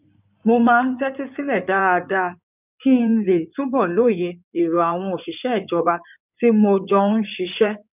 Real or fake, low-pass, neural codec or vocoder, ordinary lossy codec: fake; 3.6 kHz; codec, 16 kHz in and 24 kHz out, 2.2 kbps, FireRedTTS-2 codec; none